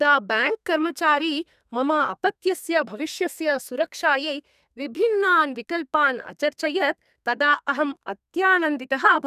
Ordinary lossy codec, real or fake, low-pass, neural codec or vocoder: none; fake; 14.4 kHz; codec, 32 kHz, 1.9 kbps, SNAC